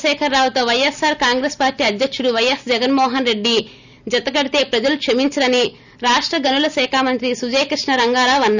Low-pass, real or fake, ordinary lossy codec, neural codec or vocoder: 7.2 kHz; real; none; none